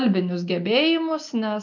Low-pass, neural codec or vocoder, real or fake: 7.2 kHz; none; real